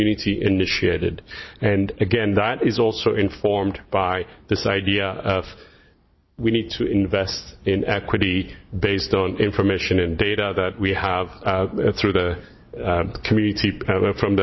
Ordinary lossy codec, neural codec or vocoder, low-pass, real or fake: MP3, 24 kbps; none; 7.2 kHz; real